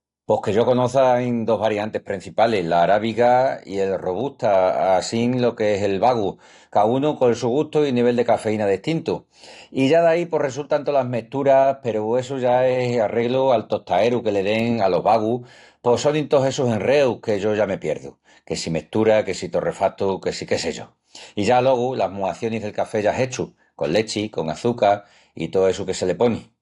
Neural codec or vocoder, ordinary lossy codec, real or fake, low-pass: none; AAC, 48 kbps; real; 10.8 kHz